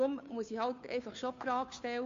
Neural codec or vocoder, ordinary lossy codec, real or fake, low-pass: codec, 16 kHz, 2 kbps, FunCodec, trained on Chinese and English, 25 frames a second; MP3, 48 kbps; fake; 7.2 kHz